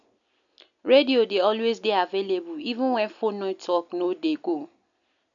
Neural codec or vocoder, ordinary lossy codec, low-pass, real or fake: none; none; 7.2 kHz; real